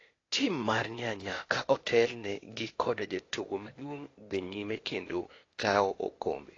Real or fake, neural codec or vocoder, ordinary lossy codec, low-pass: fake; codec, 16 kHz, 0.8 kbps, ZipCodec; AAC, 32 kbps; 7.2 kHz